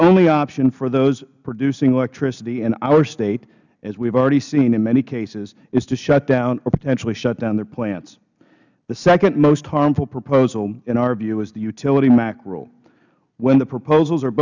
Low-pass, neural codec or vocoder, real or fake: 7.2 kHz; none; real